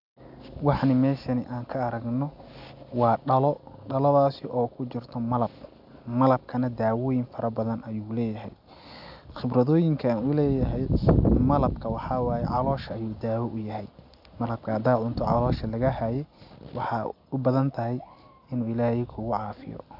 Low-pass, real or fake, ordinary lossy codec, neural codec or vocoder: 5.4 kHz; real; AAC, 48 kbps; none